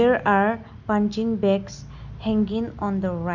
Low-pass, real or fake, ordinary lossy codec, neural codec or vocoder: 7.2 kHz; real; none; none